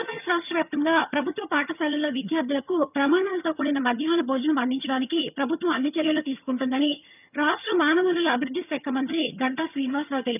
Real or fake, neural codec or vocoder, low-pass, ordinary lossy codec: fake; vocoder, 22.05 kHz, 80 mel bands, HiFi-GAN; 3.6 kHz; none